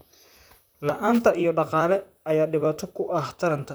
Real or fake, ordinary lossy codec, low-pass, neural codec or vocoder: fake; none; none; vocoder, 44.1 kHz, 128 mel bands, Pupu-Vocoder